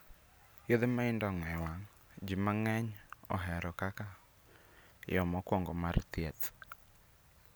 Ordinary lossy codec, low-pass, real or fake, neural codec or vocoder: none; none; real; none